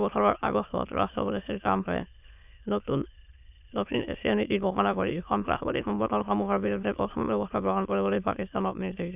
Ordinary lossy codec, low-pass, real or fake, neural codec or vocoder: none; 3.6 kHz; fake; autoencoder, 22.05 kHz, a latent of 192 numbers a frame, VITS, trained on many speakers